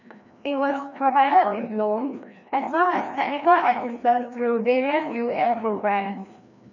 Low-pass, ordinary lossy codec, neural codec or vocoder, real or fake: 7.2 kHz; none; codec, 16 kHz, 1 kbps, FreqCodec, larger model; fake